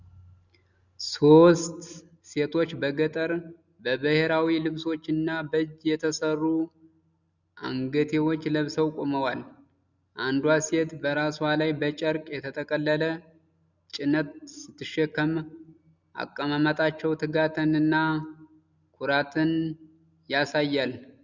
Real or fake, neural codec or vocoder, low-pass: real; none; 7.2 kHz